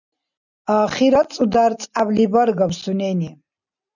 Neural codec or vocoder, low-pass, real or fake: none; 7.2 kHz; real